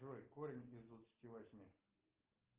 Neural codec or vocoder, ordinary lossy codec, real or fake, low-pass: none; Opus, 32 kbps; real; 3.6 kHz